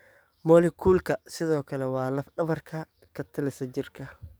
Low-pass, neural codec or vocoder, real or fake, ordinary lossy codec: none; codec, 44.1 kHz, 7.8 kbps, DAC; fake; none